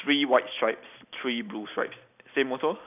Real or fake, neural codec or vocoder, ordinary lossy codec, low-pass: real; none; none; 3.6 kHz